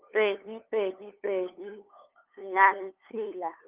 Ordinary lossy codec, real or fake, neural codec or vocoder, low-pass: Opus, 24 kbps; fake; codec, 16 kHz, 8 kbps, FunCodec, trained on LibriTTS, 25 frames a second; 3.6 kHz